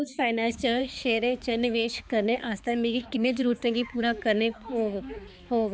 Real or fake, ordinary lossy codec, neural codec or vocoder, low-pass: fake; none; codec, 16 kHz, 4 kbps, X-Codec, HuBERT features, trained on balanced general audio; none